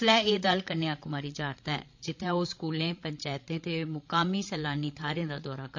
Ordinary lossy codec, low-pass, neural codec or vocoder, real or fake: MP3, 48 kbps; 7.2 kHz; codec, 16 kHz, 16 kbps, FreqCodec, larger model; fake